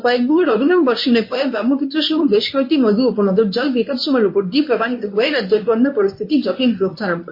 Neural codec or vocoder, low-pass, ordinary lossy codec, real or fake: codec, 24 kHz, 0.9 kbps, WavTokenizer, medium speech release version 1; 5.4 kHz; MP3, 24 kbps; fake